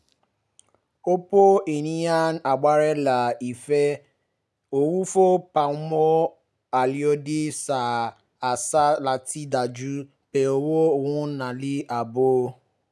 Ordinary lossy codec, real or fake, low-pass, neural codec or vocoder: none; real; none; none